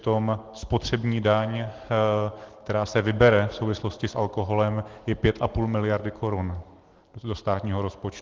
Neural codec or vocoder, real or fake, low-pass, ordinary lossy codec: none; real; 7.2 kHz; Opus, 16 kbps